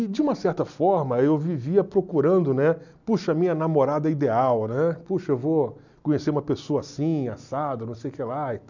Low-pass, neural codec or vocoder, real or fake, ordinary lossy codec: 7.2 kHz; none; real; none